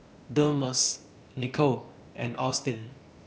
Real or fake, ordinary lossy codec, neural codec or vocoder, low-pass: fake; none; codec, 16 kHz, 0.8 kbps, ZipCodec; none